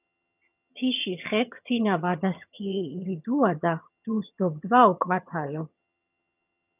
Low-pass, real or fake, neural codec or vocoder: 3.6 kHz; fake; vocoder, 22.05 kHz, 80 mel bands, HiFi-GAN